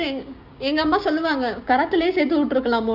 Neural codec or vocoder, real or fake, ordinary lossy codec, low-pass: codec, 16 kHz, 6 kbps, DAC; fake; Opus, 64 kbps; 5.4 kHz